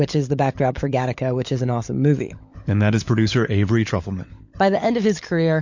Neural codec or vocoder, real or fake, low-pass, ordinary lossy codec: codec, 16 kHz, 16 kbps, FunCodec, trained on LibriTTS, 50 frames a second; fake; 7.2 kHz; MP3, 48 kbps